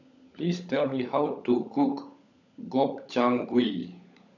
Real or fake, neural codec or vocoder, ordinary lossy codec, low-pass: fake; codec, 16 kHz, 16 kbps, FunCodec, trained on LibriTTS, 50 frames a second; none; 7.2 kHz